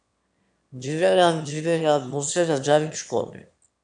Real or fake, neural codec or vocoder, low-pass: fake; autoencoder, 22.05 kHz, a latent of 192 numbers a frame, VITS, trained on one speaker; 9.9 kHz